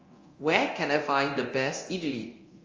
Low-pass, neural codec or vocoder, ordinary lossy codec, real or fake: 7.2 kHz; codec, 24 kHz, 0.9 kbps, DualCodec; Opus, 32 kbps; fake